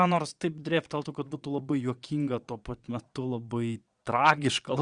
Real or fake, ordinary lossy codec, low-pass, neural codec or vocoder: fake; Opus, 64 kbps; 9.9 kHz; vocoder, 22.05 kHz, 80 mel bands, Vocos